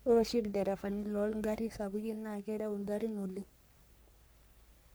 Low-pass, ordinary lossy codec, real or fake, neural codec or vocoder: none; none; fake; codec, 44.1 kHz, 3.4 kbps, Pupu-Codec